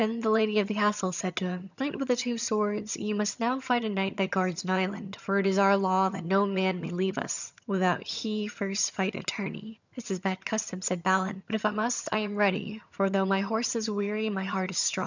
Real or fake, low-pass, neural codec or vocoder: fake; 7.2 kHz; vocoder, 22.05 kHz, 80 mel bands, HiFi-GAN